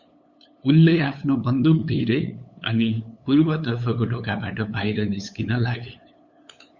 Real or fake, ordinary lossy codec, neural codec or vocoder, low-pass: fake; Opus, 64 kbps; codec, 16 kHz, 8 kbps, FunCodec, trained on LibriTTS, 25 frames a second; 7.2 kHz